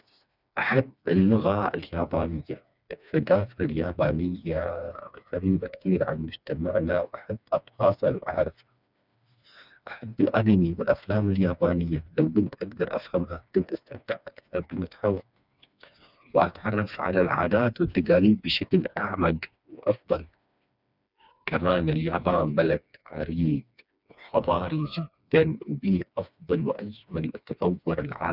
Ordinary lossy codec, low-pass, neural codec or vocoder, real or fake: none; 5.4 kHz; codec, 16 kHz, 2 kbps, FreqCodec, smaller model; fake